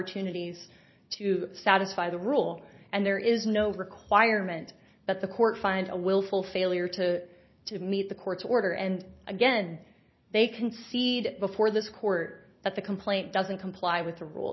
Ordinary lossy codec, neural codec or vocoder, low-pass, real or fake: MP3, 24 kbps; none; 7.2 kHz; real